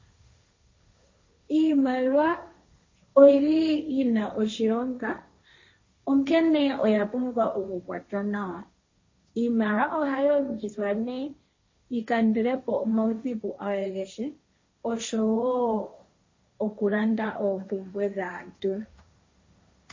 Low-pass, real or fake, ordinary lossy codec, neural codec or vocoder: 7.2 kHz; fake; MP3, 32 kbps; codec, 16 kHz, 1.1 kbps, Voila-Tokenizer